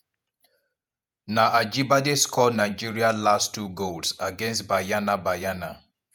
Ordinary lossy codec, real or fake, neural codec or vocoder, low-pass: none; fake; vocoder, 48 kHz, 128 mel bands, Vocos; none